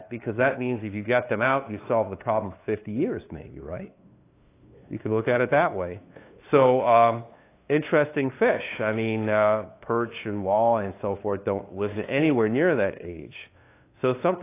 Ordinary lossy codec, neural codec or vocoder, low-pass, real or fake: AAC, 24 kbps; codec, 16 kHz, 2 kbps, FunCodec, trained on LibriTTS, 25 frames a second; 3.6 kHz; fake